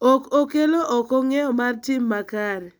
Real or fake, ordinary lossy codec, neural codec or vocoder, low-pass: real; none; none; none